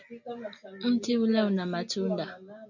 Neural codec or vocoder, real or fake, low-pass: none; real; 7.2 kHz